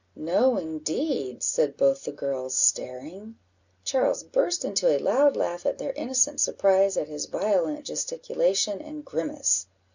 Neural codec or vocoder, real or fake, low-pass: none; real; 7.2 kHz